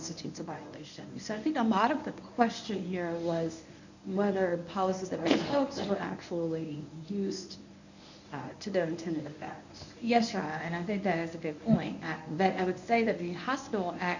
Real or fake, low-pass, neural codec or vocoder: fake; 7.2 kHz; codec, 24 kHz, 0.9 kbps, WavTokenizer, medium speech release version 1